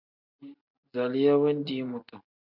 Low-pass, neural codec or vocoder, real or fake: 5.4 kHz; none; real